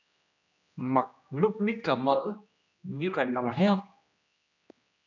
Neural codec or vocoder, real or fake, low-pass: codec, 16 kHz, 1 kbps, X-Codec, HuBERT features, trained on general audio; fake; 7.2 kHz